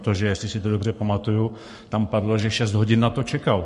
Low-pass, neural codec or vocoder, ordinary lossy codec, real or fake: 14.4 kHz; codec, 44.1 kHz, 7.8 kbps, Pupu-Codec; MP3, 48 kbps; fake